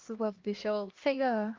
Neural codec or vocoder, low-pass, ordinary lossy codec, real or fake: codec, 16 kHz, 1 kbps, X-Codec, HuBERT features, trained on LibriSpeech; 7.2 kHz; Opus, 24 kbps; fake